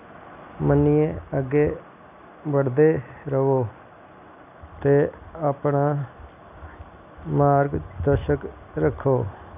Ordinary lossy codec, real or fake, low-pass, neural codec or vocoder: none; real; 3.6 kHz; none